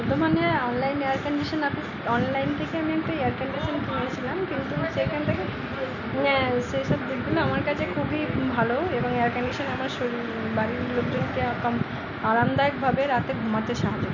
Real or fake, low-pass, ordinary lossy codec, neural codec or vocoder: real; 7.2 kHz; none; none